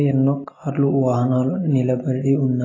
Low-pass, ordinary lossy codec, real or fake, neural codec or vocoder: 7.2 kHz; AAC, 32 kbps; real; none